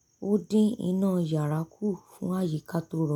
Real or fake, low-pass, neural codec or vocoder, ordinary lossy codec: real; 19.8 kHz; none; none